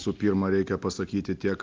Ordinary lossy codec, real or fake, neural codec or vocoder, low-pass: Opus, 24 kbps; real; none; 7.2 kHz